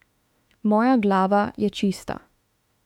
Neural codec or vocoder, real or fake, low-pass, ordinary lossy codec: autoencoder, 48 kHz, 32 numbers a frame, DAC-VAE, trained on Japanese speech; fake; 19.8 kHz; MP3, 96 kbps